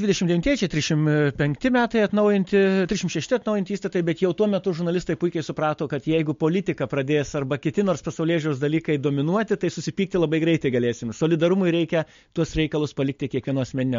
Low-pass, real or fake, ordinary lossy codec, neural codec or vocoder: 7.2 kHz; real; MP3, 48 kbps; none